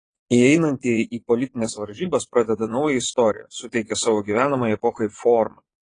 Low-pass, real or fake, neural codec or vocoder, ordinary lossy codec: 9.9 kHz; fake; vocoder, 22.05 kHz, 80 mel bands, Vocos; AAC, 32 kbps